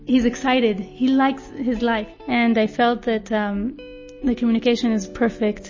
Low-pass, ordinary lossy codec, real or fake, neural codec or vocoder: 7.2 kHz; MP3, 32 kbps; real; none